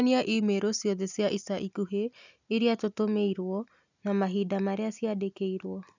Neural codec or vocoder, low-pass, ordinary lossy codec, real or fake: none; 7.2 kHz; none; real